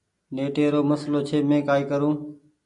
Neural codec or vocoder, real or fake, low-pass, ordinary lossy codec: none; real; 10.8 kHz; MP3, 64 kbps